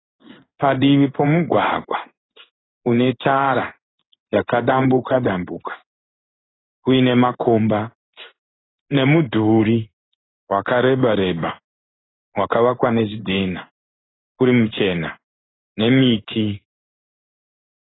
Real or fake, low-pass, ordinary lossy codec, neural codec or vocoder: fake; 7.2 kHz; AAC, 16 kbps; codec, 16 kHz in and 24 kHz out, 1 kbps, XY-Tokenizer